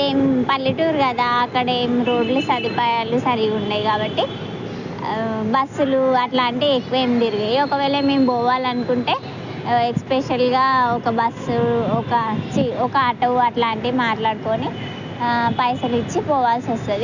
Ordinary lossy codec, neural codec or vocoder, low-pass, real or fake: none; none; 7.2 kHz; real